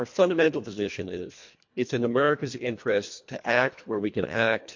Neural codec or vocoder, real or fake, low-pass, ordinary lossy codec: codec, 24 kHz, 1.5 kbps, HILCodec; fake; 7.2 kHz; MP3, 48 kbps